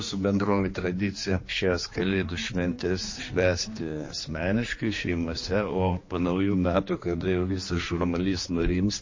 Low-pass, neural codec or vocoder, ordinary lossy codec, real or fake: 7.2 kHz; codec, 16 kHz, 2 kbps, X-Codec, HuBERT features, trained on general audio; MP3, 32 kbps; fake